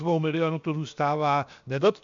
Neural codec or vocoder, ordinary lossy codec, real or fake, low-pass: codec, 16 kHz, 0.7 kbps, FocalCodec; MP3, 64 kbps; fake; 7.2 kHz